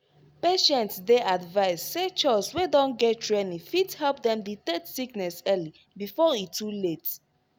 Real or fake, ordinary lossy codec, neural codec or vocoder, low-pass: real; none; none; none